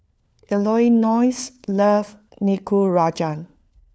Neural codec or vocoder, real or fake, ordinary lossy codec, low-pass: codec, 16 kHz, 4 kbps, FunCodec, trained on LibriTTS, 50 frames a second; fake; none; none